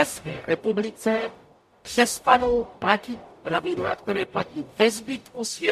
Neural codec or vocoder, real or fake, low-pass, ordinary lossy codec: codec, 44.1 kHz, 0.9 kbps, DAC; fake; 14.4 kHz; MP3, 64 kbps